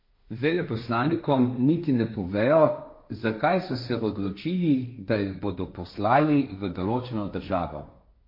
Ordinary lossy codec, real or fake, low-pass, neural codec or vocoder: MP3, 32 kbps; fake; 5.4 kHz; codec, 16 kHz, 1.1 kbps, Voila-Tokenizer